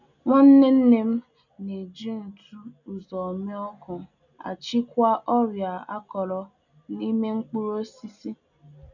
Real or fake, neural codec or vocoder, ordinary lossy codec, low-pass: real; none; none; 7.2 kHz